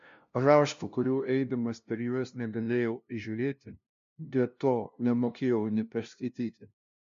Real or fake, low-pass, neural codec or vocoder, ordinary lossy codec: fake; 7.2 kHz; codec, 16 kHz, 0.5 kbps, FunCodec, trained on LibriTTS, 25 frames a second; MP3, 48 kbps